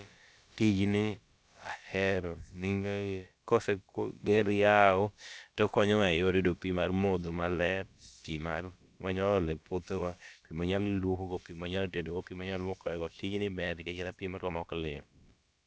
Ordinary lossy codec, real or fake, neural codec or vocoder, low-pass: none; fake; codec, 16 kHz, about 1 kbps, DyCAST, with the encoder's durations; none